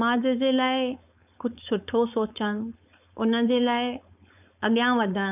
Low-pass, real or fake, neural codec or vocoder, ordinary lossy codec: 3.6 kHz; fake; codec, 16 kHz, 4.8 kbps, FACodec; none